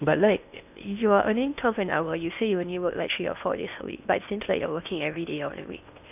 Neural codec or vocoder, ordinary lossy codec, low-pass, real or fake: codec, 16 kHz in and 24 kHz out, 0.8 kbps, FocalCodec, streaming, 65536 codes; none; 3.6 kHz; fake